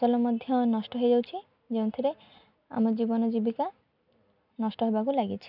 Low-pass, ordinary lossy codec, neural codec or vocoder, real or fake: 5.4 kHz; none; none; real